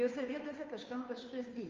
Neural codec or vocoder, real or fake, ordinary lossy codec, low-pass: codec, 16 kHz, 2 kbps, FunCodec, trained on Chinese and English, 25 frames a second; fake; Opus, 24 kbps; 7.2 kHz